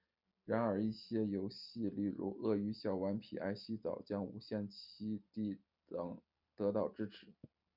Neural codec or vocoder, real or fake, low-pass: none; real; 5.4 kHz